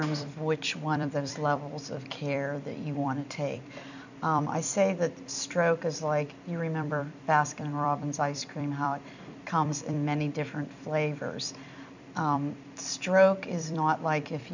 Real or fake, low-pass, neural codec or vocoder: fake; 7.2 kHz; vocoder, 44.1 kHz, 128 mel bands every 256 samples, BigVGAN v2